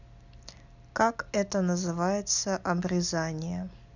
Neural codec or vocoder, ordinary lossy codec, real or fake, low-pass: none; none; real; 7.2 kHz